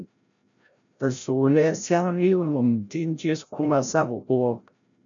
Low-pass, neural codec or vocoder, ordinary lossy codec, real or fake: 7.2 kHz; codec, 16 kHz, 0.5 kbps, FreqCodec, larger model; MP3, 96 kbps; fake